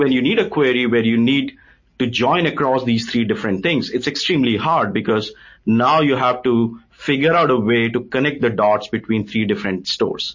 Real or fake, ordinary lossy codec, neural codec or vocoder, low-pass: real; MP3, 32 kbps; none; 7.2 kHz